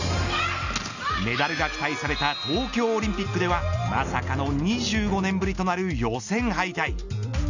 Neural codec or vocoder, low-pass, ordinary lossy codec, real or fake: none; 7.2 kHz; none; real